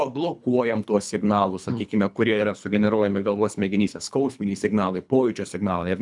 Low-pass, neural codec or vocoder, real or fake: 10.8 kHz; codec, 24 kHz, 3 kbps, HILCodec; fake